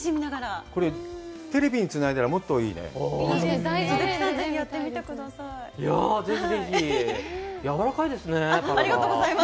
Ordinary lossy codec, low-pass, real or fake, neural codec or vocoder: none; none; real; none